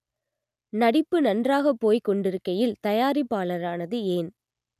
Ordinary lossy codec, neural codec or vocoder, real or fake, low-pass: none; none; real; 14.4 kHz